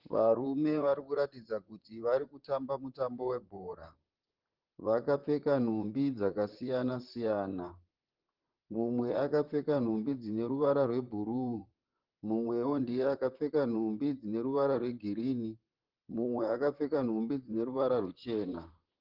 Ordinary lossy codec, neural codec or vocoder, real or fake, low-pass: Opus, 16 kbps; vocoder, 44.1 kHz, 128 mel bands, Pupu-Vocoder; fake; 5.4 kHz